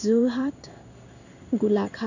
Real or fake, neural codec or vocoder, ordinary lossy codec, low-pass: fake; codec, 16 kHz in and 24 kHz out, 1 kbps, XY-Tokenizer; none; 7.2 kHz